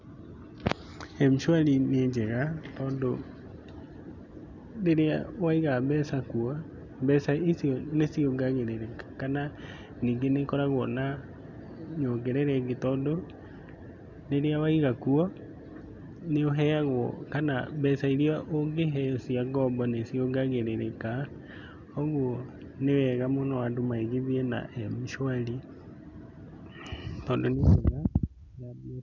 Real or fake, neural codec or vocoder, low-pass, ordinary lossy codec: real; none; 7.2 kHz; none